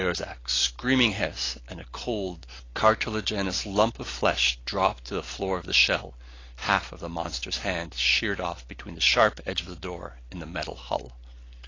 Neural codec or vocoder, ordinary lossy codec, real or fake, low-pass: none; AAC, 32 kbps; real; 7.2 kHz